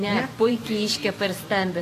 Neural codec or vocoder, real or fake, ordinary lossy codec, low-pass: vocoder, 44.1 kHz, 128 mel bands every 512 samples, BigVGAN v2; fake; AAC, 48 kbps; 14.4 kHz